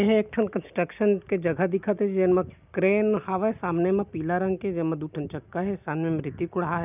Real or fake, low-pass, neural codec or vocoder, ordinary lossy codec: real; 3.6 kHz; none; none